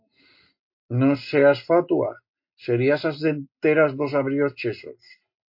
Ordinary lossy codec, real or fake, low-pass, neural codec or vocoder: MP3, 32 kbps; real; 5.4 kHz; none